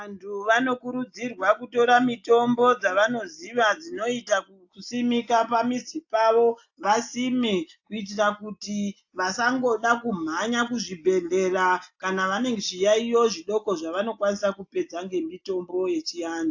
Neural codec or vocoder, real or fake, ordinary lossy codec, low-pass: none; real; AAC, 48 kbps; 7.2 kHz